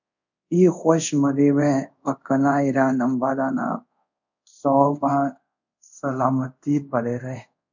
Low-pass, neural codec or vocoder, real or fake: 7.2 kHz; codec, 24 kHz, 0.5 kbps, DualCodec; fake